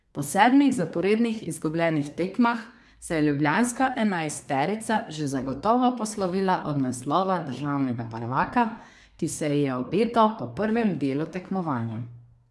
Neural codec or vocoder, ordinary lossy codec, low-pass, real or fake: codec, 24 kHz, 1 kbps, SNAC; none; none; fake